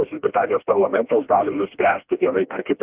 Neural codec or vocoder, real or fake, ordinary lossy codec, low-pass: codec, 16 kHz, 1 kbps, FreqCodec, smaller model; fake; Opus, 32 kbps; 3.6 kHz